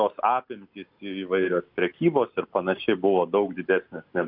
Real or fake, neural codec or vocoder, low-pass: real; none; 5.4 kHz